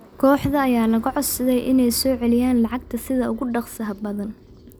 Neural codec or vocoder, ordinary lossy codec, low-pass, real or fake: none; none; none; real